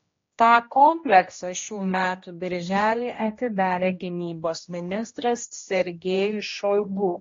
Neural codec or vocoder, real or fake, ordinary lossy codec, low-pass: codec, 16 kHz, 1 kbps, X-Codec, HuBERT features, trained on general audio; fake; AAC, 32 kbps; 7.2 kHz